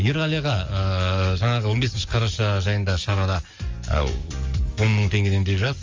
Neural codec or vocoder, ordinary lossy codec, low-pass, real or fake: codec, 44.1 kHz, 7.8 kbps, DAC; Opus, 32 kbps; 7.2 kHz; fake